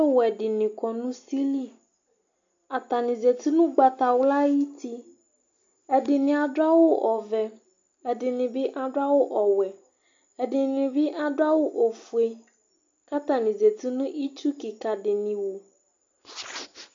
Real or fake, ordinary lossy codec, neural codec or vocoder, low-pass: real; MP3, 64 kbps; none; 7.2 kHz